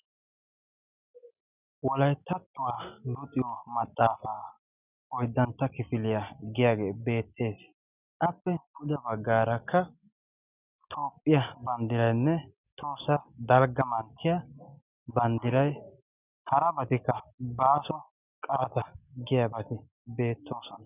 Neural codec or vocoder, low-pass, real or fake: none; 3.6 kHz; real